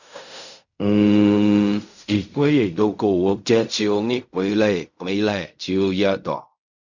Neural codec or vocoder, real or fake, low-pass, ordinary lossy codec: codec, 16 kHz in and 24 kHz out, 0.4 kbps, LongCat-Audio-Codec, fine tuned four codebook decoder; fake; 7.2 kHz; none